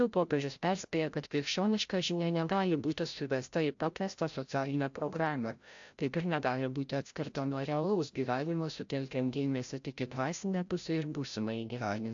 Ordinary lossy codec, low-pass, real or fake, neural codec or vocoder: AAC, 64 kbps; 7.2 kHz; fake; codec, 16 kHz, 0.5 kbps, FreqCodec, larger model